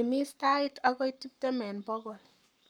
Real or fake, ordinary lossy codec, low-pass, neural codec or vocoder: fake; none; none; codec, 44.1 kHz, 7.8 kbps, Pupu-Codec